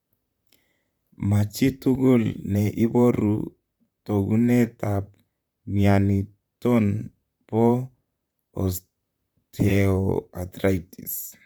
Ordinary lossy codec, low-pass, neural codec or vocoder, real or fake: none; none; vocoder, 44.1 kHz, 128 mel bands, Pupu-Vocoder; fake